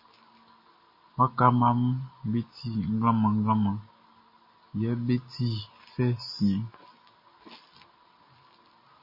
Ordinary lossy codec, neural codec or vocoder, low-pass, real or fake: MP3, 24 kbps; none; 5.4 kHz; real